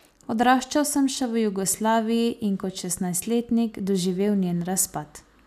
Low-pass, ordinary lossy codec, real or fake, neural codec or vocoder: 14.4 kHz; none; real; none